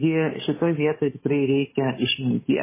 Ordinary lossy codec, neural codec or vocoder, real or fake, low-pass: MP3, 16 kbps; codec, 16 kHz, 8 kbps, FunCodec, trained on Chinese and English, 25 frames a second; fake; 3.6 kHz